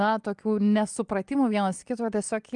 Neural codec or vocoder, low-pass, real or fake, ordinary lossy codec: none; 10.8 kHz; real; Opus, 32 kbps